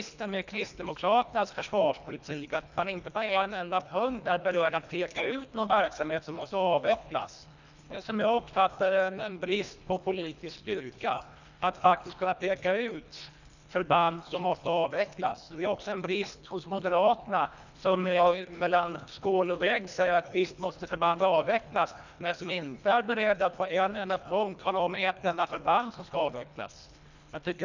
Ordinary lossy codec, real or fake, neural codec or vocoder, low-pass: none; fake; codec, 24 kHz, 1.5 kbps, HILCodec; 7.2 kHz